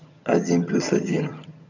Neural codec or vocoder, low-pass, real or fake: vocoder, 22.05 kHz, 80 mel bands, HiFi-GAN; 7.2 kHz; fake